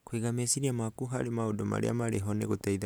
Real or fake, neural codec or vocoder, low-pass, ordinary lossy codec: real; none; none; none